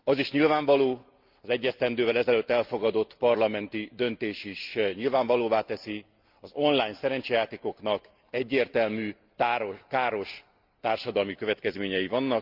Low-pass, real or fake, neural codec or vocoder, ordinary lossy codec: 5.4 kHz; real; none; Opus, 24 kbps